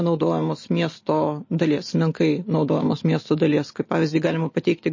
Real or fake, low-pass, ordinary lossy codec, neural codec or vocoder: real; 7.2 kHz; MP3, 32 kbps; none